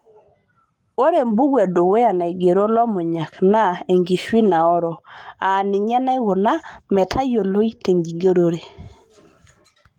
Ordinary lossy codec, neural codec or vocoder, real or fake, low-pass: Opus, 32 kbps; codec, 44.1 kHz, 7.8 kbps, Pupu-Codec; fake; 19.8 kHz